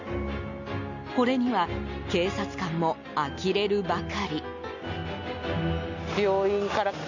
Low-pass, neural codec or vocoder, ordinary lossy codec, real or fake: 7.2 kHz; none; Opus, 64 kbps; real